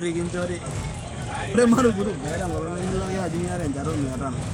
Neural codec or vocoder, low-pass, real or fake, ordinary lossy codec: codec, 44.1 kHz, 7.8 kbps, DAC; none; fake; none